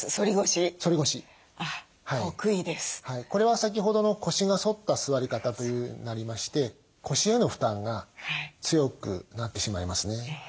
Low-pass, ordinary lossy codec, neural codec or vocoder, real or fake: none; none; none; real